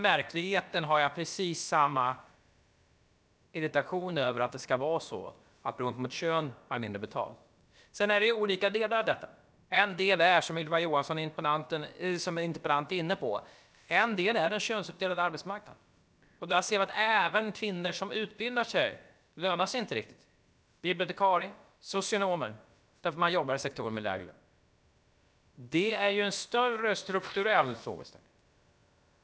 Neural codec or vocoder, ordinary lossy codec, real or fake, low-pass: codec, 16 kHz, about 1 kbps, DyCAST, with the encoder's durations; none; fake; none